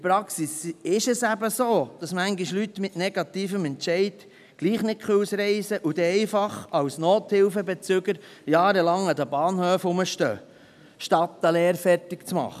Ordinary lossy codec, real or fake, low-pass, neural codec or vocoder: none; real; 14.4 kHz; none